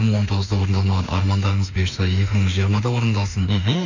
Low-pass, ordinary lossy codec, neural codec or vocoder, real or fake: 7.2 kHz; none; autoencoder, 48 kHz, 32 numbers a frame, DAC-VAE, trained on Japanese speech; fake